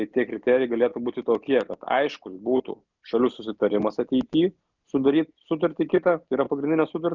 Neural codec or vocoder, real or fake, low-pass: none; real; 7.2 kHz